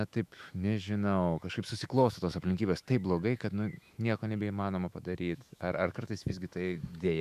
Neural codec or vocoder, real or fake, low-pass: autoencoder, 48 kHz, 128 numbers a frame, DAC-VAE, trained on Japanese speech; fake; 14.4 kHz